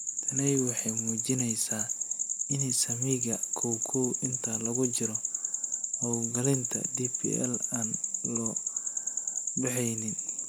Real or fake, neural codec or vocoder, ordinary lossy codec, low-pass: real; none; none; none